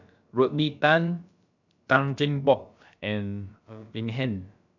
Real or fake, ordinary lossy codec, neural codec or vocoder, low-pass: fake; none; codec, 16 kHz, about 1 kbps, DyCAST, with the encoder's durations; 7.2 kHz